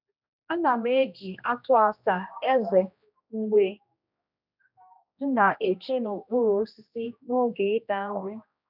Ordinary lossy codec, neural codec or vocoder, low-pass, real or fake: none; codec, 16 kHz, 1 kbps, X-Codec, HuBERT features, trained on general audio; 5.4 kHz; fake